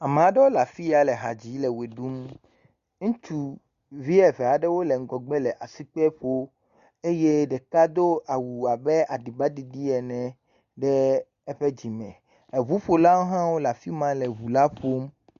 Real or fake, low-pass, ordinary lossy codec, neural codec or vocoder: real; 7.2 kHz; Opus, 64 kbps; none